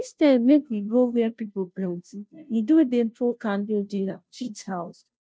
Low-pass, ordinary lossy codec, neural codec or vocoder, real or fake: none; none; codec, 16 kHz, 0.5 kbps, FunCodec, trained on Chinese and English, 25 frames a second; fake